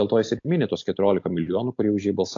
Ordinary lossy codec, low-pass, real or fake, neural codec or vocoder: AAC, 48 kbps; 7.2 kHz; real; none